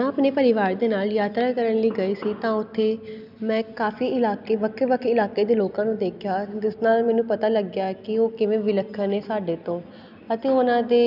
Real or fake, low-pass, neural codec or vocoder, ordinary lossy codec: real; 5.4 kHz; none; none